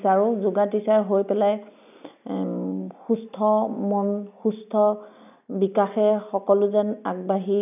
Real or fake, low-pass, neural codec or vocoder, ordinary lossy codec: real; 3.6 kHz; none; none